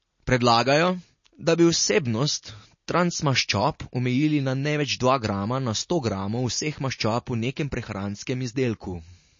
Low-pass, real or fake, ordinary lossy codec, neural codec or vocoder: 7.2 kHz; real; MP3, 32 kbps; none